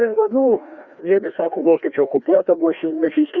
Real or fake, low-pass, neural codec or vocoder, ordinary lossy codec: fake; 7.2 kHz; codec, 16 kHz, 1 kbps, FreqCodec, larger model; Opus, 64 kbps